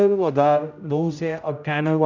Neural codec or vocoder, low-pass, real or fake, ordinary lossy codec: codec, 16 kHz, 0.5 kbps, X-Codec, HuBERT features, trained on general audio; 7.2 kHz; fake; none